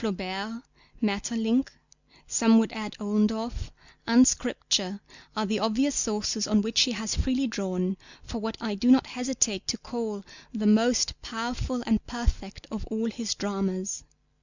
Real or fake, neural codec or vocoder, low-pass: real; none; 7.2 kHz